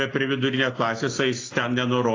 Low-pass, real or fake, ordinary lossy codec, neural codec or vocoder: 7.2 kHz; real; AAC, 32 kbps; none